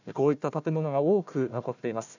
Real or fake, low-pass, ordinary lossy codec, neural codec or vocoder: fake; 7.2 kHz; none; codec, 16 kHz, 1 kbps, FunCodec, trained on Chinese and English, 50 frames a second